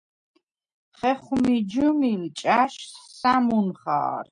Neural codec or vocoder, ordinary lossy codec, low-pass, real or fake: none; MP3, 64 kbps; 9.9 kHz; real